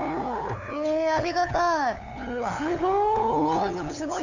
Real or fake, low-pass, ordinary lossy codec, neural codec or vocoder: fake; 7.2 kHz; none; codec, 16 kHz, 8 kbps, FunCodec, trained on LibriTTS, 25 frames a second